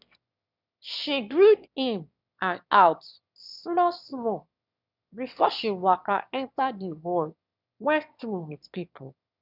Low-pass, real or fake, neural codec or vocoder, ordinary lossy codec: 5.4 kHz; fake; autoencoder, 22.05 kHz, a latent of 192 numbers a frame, VITS, trained on one speaker; none